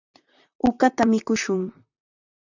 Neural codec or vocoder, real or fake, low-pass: vocoder, 44.1 kHz, 128 mel bands, Pupu-Vocoder; fake; 7.2 kHz